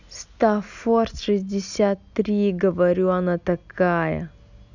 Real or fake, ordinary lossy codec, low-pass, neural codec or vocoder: real; none; 7.2 kHz; none